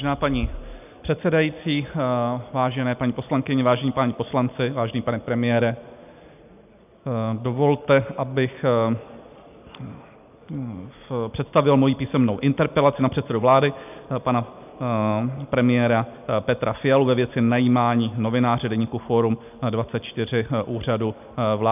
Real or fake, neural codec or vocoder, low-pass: real; none; 3.6 kHz